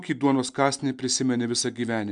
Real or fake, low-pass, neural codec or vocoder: real; 9.9 kHz; none